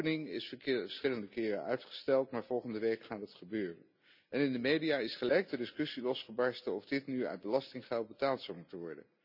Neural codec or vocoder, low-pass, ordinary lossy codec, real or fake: none; 5.4 kHz; none; real